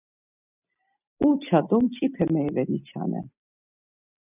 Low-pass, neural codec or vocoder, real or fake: 3.6 kHz; none; real